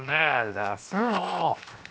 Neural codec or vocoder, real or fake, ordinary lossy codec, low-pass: codec, 16 kHz, 0.7 kbps, FocalCodec; fake; none; none